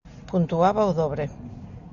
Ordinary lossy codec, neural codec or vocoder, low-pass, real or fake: Opus, 64 kbps; none; 7.2 kHz; real